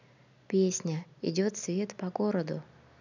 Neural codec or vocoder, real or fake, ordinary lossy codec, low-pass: none; real; none; 7.2 kHz